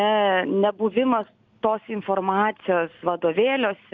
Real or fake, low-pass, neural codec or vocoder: real; 7.2 kHz; none